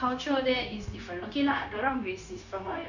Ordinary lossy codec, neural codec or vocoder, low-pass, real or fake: none; codec, 16 kHz, 0.9 kbps, LongCat-Audio-Codec; 7.2 kHz; fake